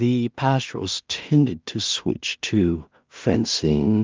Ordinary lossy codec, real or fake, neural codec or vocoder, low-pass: Opus, 24 kbps; fake; codec, 16 kHz in and 24 kHz out, 0.4 kbps, LongCat-Audio-Codec, two codebook decoder; 7.2 kHz